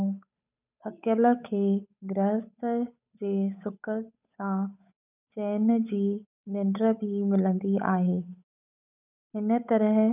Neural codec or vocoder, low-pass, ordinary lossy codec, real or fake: codec, 16 kHz, 8 kbps, FunCodec, trained on Chinese and English, 25 frames a second; 3.6 kHz; none; fake